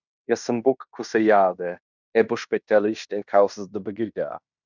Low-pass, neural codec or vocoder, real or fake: 7.2 kHz; codec, 16 kHz in and 24 kHz out, 0.9 kbps, LongCat-Audio-Codec, fine tuned four codebook decoder; fake